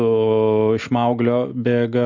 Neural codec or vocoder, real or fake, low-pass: none; real; 7.2 kHz